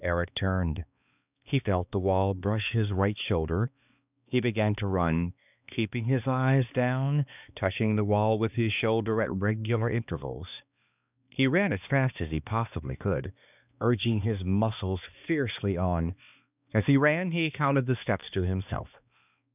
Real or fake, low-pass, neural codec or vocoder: fake; 3.6 kHz; codec, 16 kHz, 2 kbps, X-Codec, HuBERT features, trained on balanced general audio